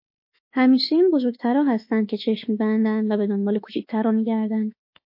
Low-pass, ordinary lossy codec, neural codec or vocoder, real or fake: 5.4 kHz; MP3, 32 kbps; autoencoder, 48 kHz, 32 numbers a frame, DAC-VAE, trained on Japanese speech; fake